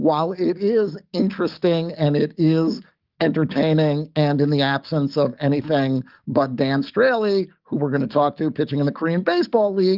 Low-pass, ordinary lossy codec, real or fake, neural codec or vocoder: 5.4 kHz; Opus, 24 kbps; fake; codec, 44.1 kHz, 7.8 kbps, DAC